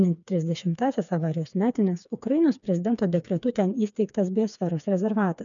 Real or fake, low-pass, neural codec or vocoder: fake; 7.2 kHz; codec, 16 kHz, 4 kbps, FreqCodec, smaller model